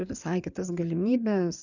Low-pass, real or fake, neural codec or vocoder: 7.2 kHz; fake; codec, 16 kHz in and 24 kHz out, 2.2 kbps, FireRedTTS-2 codec